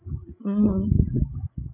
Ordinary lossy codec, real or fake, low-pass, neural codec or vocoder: none; fake; 3.6 kHz; codec, 16 kHz, 8 kbps, FreqCodec, larger model